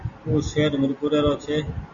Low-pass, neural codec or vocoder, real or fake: 7.2 kHz; none; real